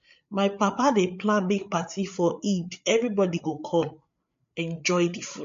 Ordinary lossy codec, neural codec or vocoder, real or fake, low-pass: MP3, 48 kbps; codec, 16 kHz, 8 kbps, FreqCodec, larger model; fake; 7.2 kHz